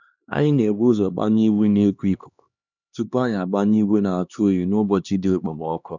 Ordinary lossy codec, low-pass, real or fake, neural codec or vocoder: none; 7.2 kHz; fake; codec, 16 kHz, 2 kbps, X-Codec, HuBERT features, trained on LibriSpeech